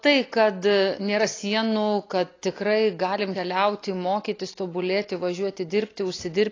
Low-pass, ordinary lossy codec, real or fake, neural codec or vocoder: 7.2 kHz; AAC, 32 kbps; real; none